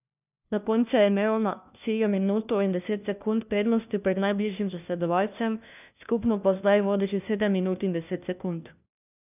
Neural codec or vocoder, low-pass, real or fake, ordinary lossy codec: codec, 16 kHz, 1 kbps, FunCodec, trained on LibriTTS, 50 frames a second; 3.6 kHz; fake; none